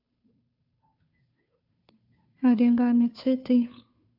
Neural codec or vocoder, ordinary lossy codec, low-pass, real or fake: codec, 16 kHz, 2 kbps, FunCodec, trained on Chinese and English, 25 frames a second; AAC, 48 kbps; 5.4 kHz; fake